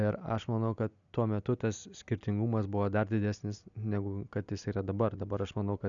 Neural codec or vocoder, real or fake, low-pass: none; real; 7.2 kHz